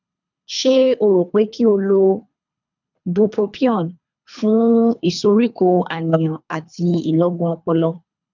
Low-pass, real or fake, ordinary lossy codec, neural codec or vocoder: 7.2 kHz; fake; none; codec, 24 kHz, 3 kbps, HILCodec